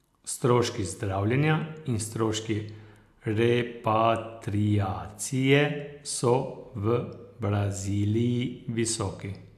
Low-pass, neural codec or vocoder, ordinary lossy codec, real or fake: 14.4 kHz; none; AAC, 96 kbps; real